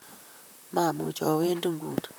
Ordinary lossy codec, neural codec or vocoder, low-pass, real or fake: none; vocoder, 44.1 kHz, 128 mel bands, Pupu-Vocoder; none; fake